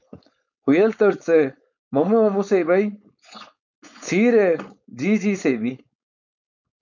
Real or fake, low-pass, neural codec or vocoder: fake; 7.2 kHz; codec, 16 kHz, 4.8 kbps, FACodec